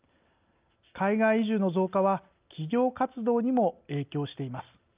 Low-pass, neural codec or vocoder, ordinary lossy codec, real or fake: 3.6 kHz; none; Opus, 24 kbps; real